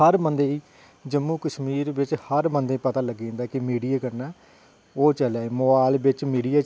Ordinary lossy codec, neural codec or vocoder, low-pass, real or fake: none; none; none; real